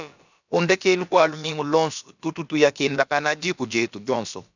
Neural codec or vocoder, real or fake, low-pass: codec, 16 kHz, about 1 kbps, DyCAST, with the encoder's durations; fake; 7.2 kHz